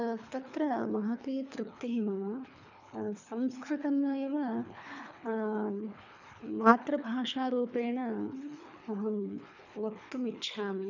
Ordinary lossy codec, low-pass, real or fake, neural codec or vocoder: none; 7.2 kHz; fake; codec, 24 kHz, 3 kbps, HILCodec